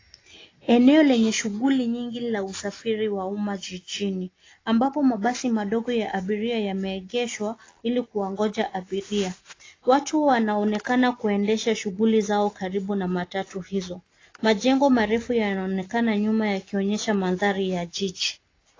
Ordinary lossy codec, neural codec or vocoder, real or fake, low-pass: AAC, 32 kbps; none; real; 7.2 kHz